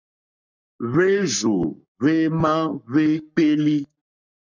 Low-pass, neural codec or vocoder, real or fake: 7.2 kHz; codec, 44.1 kHz, 7.8 kbps, Pupu-Codec; fake